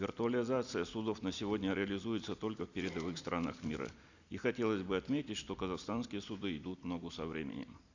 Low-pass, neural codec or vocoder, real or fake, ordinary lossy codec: 7.2 kHz; none; real; Opus, 64 kbps